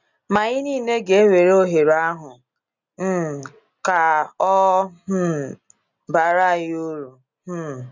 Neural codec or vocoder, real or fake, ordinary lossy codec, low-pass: none; real; none; 7.2 kHz